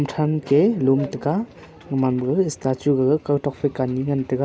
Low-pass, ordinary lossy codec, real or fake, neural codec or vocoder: none; none; real; none